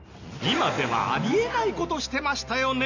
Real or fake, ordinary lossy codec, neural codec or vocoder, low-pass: real; none; none; 7.2 kHz